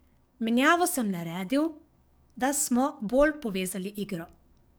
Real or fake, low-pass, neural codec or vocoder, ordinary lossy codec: fake; none; codec, 44.1 kHz, 7.8 kbps, DAC; none